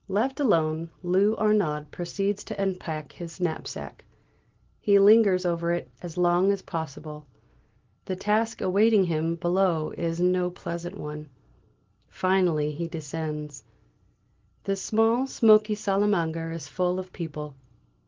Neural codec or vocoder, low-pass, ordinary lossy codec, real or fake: none; 7.2 kHz; Opus, 16 kbps; real